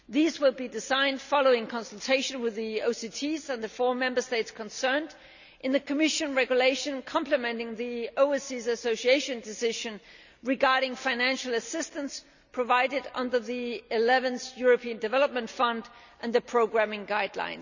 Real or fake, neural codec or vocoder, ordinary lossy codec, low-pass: real; none; none; 7.2 kHz